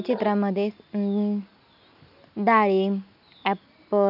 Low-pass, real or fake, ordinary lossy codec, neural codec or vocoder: 5.4 kHz; real; none; none